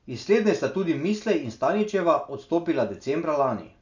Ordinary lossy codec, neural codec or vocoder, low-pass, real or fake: none; none; 7.2 kHz; real